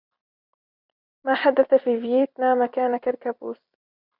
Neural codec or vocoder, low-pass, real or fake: vocoder, 44.1 kHz, 128 mel bands every 256 samples, BigVGAN v2; 5.4 kHz; fake